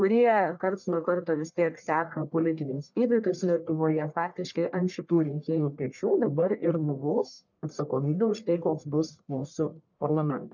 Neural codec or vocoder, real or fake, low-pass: codec, 44.1 kHz, 1.7 kbps, Pupu-Codec; fake; 7.2 kHz